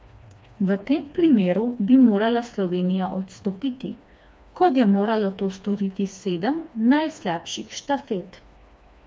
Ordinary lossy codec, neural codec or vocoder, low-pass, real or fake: none; codec, 16 kHz, 2 kbps, FreqCodec, smaller model; none; fake